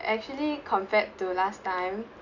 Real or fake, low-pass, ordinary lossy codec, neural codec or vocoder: real; 7.2 kHz; none; none